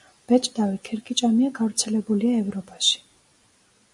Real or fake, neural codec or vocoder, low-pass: real; none; 10.8 kHz